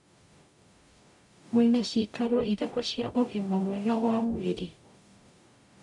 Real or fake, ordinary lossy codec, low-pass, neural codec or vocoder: fake; none; 10.8 kHz; codec, 44.1 kHz, 0.9 kbps, DAC